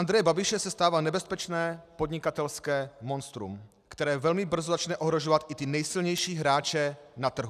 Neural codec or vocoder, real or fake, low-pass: none; real; 14.4 kHz